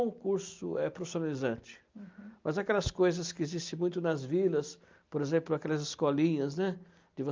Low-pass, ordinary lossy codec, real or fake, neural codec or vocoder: 7.2 kHz; Opus, 24 kbps; real; none